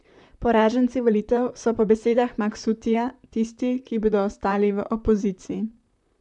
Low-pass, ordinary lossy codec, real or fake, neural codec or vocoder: 10.8 kHz; none; fake; vocoder, 44.1 kHz, 128 mel bands, Pupu-Vocoder